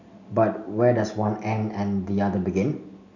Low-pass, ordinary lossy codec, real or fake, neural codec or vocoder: 7.2 kHz; none; real; none